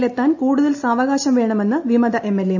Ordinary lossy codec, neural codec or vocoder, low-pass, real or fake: none; none; 7.2 kHz; real